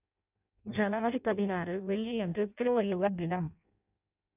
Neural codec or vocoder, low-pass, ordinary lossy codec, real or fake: codec, 16 kHz in and 24 kHz out, 0.6 kbps, FireRedTTS-2 codec; 3.6 kHz; none; fake